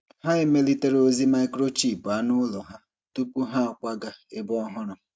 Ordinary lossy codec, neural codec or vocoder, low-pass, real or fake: none; none; none; real